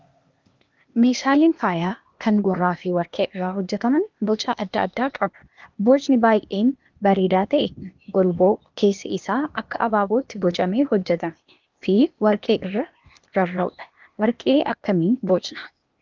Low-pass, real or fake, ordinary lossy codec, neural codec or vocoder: 7.2 kHz; fake; Opus, 24 kbps; codec, 16 kHz, 0.8 kbps, ZipCodec